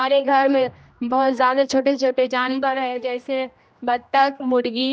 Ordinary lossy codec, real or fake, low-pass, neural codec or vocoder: none; fake; none; codec, 16 kHz, 1 kbps, X-Codec, HuBERT features, trained on general audio